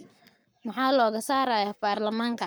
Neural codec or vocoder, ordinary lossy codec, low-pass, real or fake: codec, 44.1 kHz, 7.8 kbps, Pupu-Codec; none; none; fake